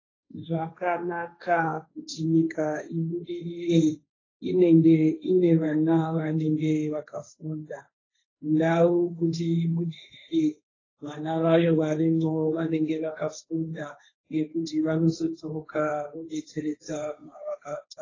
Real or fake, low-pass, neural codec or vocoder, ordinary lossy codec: fake; 7.2 kHz; codec, 16 kHz, 1.1 kbps, Voila-Tokenizer; AAC, 32 kbps